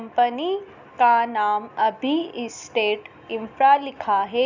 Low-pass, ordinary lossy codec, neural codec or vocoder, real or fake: 7.2 kHz; none; none; real